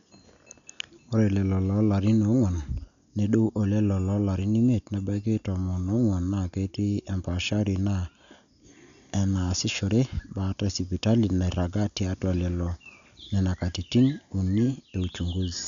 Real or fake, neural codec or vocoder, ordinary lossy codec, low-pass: real; none; none; 7.2 kHz